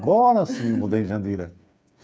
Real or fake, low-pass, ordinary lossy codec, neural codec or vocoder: fake; none; none; codec, 16 kHz, 8 kbps, FreqCodec, smaller model